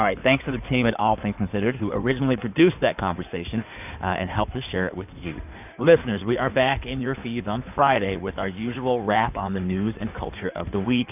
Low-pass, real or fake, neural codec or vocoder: 3.6 kHz; fake; codec, 16 kHz in and 24 kHz out, 2.2 kbps, FireRedTTS-2 codec